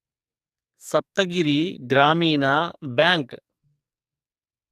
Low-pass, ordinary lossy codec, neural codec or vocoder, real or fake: 14.4 kHz; AAC, 96 kbps; codec, 44.1 kHz, 2.6 kbps, SNAC; fake